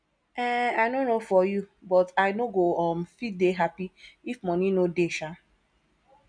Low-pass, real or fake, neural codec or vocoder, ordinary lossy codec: 9.9 kHz; real; none; none